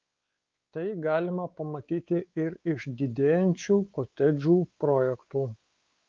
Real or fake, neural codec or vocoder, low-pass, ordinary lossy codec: fake; codec, 16 kHz, 4 kbps, X-Codec, WavLM features, trained on Multilingual LibriSpeech; 7.2 kHz; Opus, 24 kbps